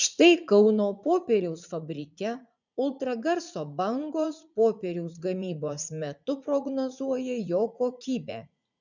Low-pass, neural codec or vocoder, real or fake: 7.2 kHz; vocoder, 44.1 kHz, 80 mel bands, Vocos; fake